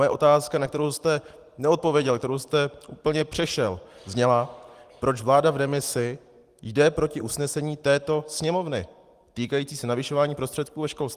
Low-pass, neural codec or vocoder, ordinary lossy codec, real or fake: 14.4 kHz; none; Opus, 24 kbps; real